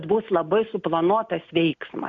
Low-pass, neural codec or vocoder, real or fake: 7.2 kHz; none; real